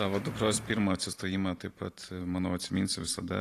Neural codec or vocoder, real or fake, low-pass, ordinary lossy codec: vocoder, 44.1 kHz, 128 mel bands every 512 samples, BigVGAN v2; fake; 14.4 kHz; AAC, 48 kbps